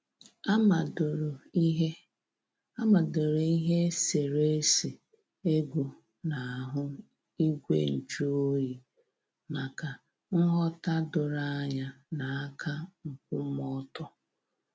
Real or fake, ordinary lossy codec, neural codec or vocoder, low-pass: real; none; none; none